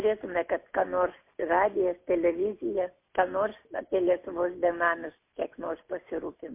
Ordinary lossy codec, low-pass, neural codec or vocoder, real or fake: MP3, 24 kbps; 3.6 kHz; none; real